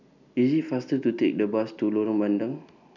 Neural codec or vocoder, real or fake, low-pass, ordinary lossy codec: none; real; 7.2 kHz; none